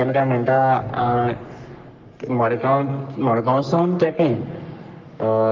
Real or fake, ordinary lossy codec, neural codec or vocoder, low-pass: fake; Opus, 24 kbps; codec, 44.1 kHz, 3.4 kbps, Pupu-Codec; 7.2 kHz